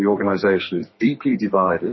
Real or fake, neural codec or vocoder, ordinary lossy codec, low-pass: fake; codec, 32 kHz, 1.9 kbps, SNAC; MP3, 24 kbps; 7.2 kHz